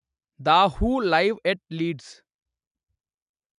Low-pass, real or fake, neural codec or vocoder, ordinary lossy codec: 10.8 kHz; real; none; none